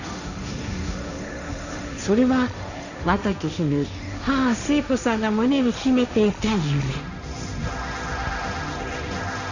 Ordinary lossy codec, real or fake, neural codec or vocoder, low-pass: none; fake; codec, 16 kHz, 1.1 kbps, Voila-Tokenizer; 7.2 kHz